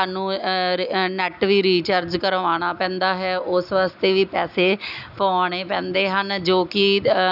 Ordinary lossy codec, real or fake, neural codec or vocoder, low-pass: none; real; none; 5.4 kHz